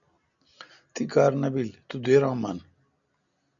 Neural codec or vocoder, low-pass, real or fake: none; 7.2 kHz; real